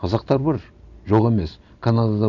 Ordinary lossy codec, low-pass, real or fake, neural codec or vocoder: none; 7.2 kHz; real; none